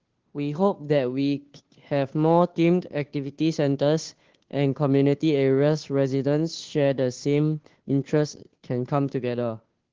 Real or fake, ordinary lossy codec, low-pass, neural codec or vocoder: fake; Opus, 16 kbps; 7.2 kHz; codec, 16 kHz, 2 kbps, FunCodec, trained on Chinese and English, 25 frames a second